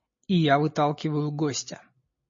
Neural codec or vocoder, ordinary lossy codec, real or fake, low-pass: none; MP3, 32 kbps; real; 7.2 kHz